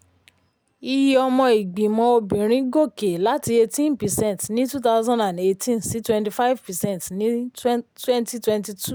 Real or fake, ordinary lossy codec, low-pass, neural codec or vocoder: real; none; none; none